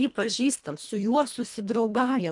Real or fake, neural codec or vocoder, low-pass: fake; codec, 24 kHz, 1.5 kbps, HILCodec; 10.8 kHz